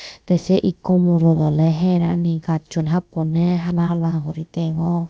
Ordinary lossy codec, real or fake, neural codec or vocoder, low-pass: none; fake; codec, 16 kHz, about 1 kbps, DyCAST, with the encoder's durations; none